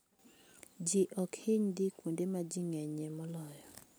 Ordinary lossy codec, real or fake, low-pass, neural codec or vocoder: none; real; none; none